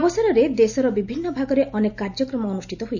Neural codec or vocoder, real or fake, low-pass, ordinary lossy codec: none; real; 7.2 kHz; none